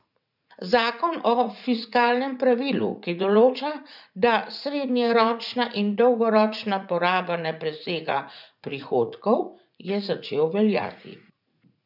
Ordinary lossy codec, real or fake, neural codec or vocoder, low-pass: none; fake; vocoder, 44.1 kHz, 80 mel bands, Vocos; 5.4 kHz